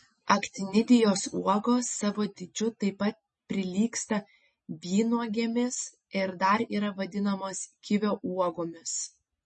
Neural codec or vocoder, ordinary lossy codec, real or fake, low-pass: none; MP3, 32 kbps; real; 10.8 kHz